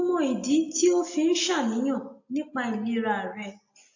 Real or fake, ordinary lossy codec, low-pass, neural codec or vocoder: real; AAC, 48 kbps; 7.2 kHz; none